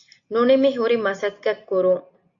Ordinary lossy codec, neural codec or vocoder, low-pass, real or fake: MP3, 48 kbps; none; 7.2 kHz; real